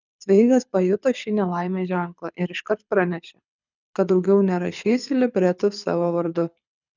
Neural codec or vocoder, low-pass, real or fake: codec, 24 kHz, 6 kbps, HILCodec; 7.2 kHz; fake